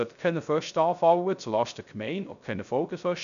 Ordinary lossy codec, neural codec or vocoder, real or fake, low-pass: none; codec, 16 kHz, 0.3 kbps, FocalCodec; fake; 7.2 kHz